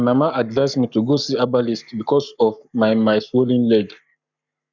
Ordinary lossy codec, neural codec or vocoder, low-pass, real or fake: none; codec, 44.1 kHz, 7.8 kbps, Pupu-Codec; 7.2 kHz; fake